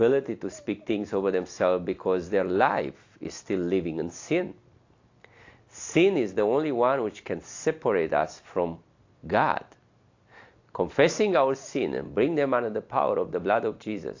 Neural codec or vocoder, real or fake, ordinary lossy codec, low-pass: none; real; AAC, 48 kbps; 7.2 kHz